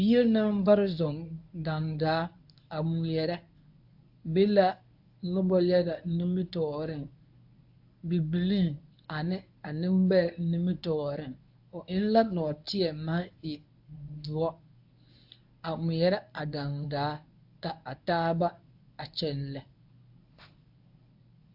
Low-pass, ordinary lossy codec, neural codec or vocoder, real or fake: 5.4 kHz; AAC, 48 kbps; codec, 24 kHz, 0.9 kbps, WavTokenizer, medium speech release version 1; fake